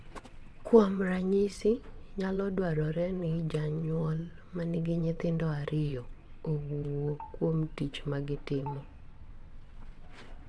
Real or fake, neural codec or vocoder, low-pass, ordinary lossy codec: fake; vocoder, 22.05 kHz, 80 mel bands, WaveNeXt; none; none